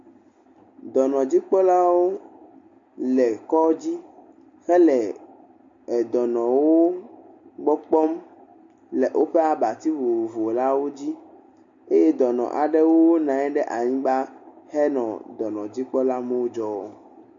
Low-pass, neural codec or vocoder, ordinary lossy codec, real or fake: 7.2 kHz; none; MP3, 48 kbps; real